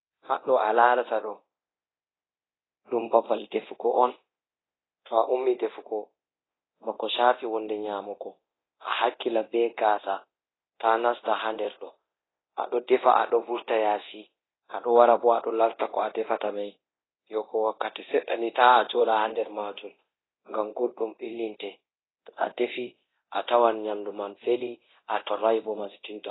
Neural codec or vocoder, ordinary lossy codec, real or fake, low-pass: codec, 24 kHz, 0.9 kbps, DualCodec; AAC, 16 kbps; fake; 7.2 kHz